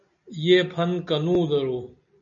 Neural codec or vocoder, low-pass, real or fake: none; 7.2 kHz; real